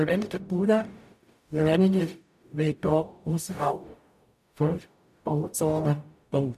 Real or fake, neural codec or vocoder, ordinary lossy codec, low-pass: fake; codec, 44.1 kHz, 0.9 kbps, DAC; none; 14.4 kHz